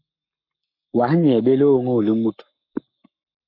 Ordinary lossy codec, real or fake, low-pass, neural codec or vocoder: MP3, 48 kbps; fake; 5.4 kHz; codec, 44.1 kHz, 7.8 kbps, Pupu-Codec